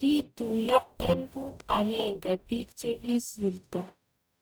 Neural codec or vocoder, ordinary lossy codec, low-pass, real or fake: codec, 44.1 kHz, 0.9 kbps, DAC; none; none; fake